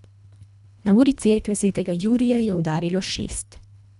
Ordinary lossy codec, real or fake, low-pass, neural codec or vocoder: none; fake; 10.8 kHz; codec, 24 kHz, 1.5 kbps, HILCodec